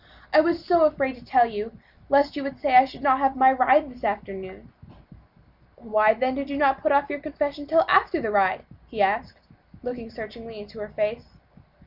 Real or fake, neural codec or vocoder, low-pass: real; none; 5.4 kHz